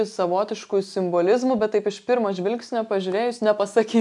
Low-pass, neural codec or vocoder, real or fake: 10.8 kHz; none; real